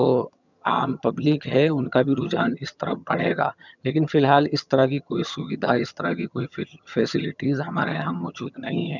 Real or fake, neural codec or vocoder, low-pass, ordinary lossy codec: fake; vocoder, 22.05 kHz, 80 mel bands, HiFi-GAN; 7.2 kHz; none